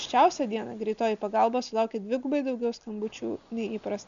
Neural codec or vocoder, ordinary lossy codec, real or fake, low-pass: none; MP3, 96 kbps; real; 7.2 kHz